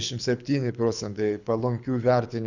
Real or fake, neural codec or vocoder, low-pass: fake; codec, 24 kHz, 6 kbps, HILCodec; 7.2 kHz